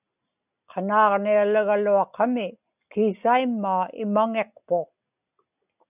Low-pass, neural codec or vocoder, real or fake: 3.6 kHz; none; real